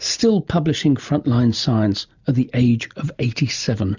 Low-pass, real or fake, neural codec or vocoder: 7.2 kHz; real; none